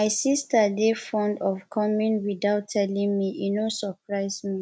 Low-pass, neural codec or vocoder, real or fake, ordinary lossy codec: none; none; real; none